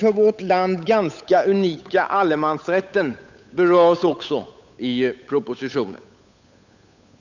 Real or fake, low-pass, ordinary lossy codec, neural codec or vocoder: fake; 7.2 kHz; none; codec, 16 kHz, 8 kbps, FunCodec, trained on Chinese and English, 25 frames a second